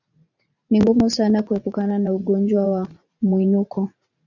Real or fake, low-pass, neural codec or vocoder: fake; 7.2 kHz; vocoder, 44.1 kHz, 80 mel bands, Vocos